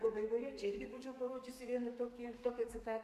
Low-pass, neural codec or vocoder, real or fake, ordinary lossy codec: 14.4 kHz; codec, 32 kHz, 1.9 kbps, SNAC; fake; MP3, 96 kbps